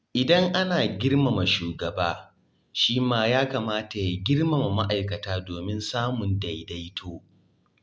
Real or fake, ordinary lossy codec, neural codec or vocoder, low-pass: real; none; none; none